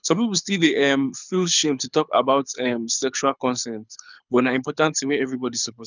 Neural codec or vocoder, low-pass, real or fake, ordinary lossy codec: codec, 24 kHz, 6 kbps, HILCodec; 7.2 kHz; fake; none